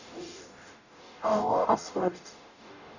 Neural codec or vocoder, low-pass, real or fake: codec, 44.1 kHz, 0.9 kbps, DAC; 7.2 kHz; fake